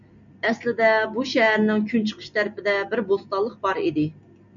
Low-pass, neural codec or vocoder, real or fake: 7.2 kHz; none; real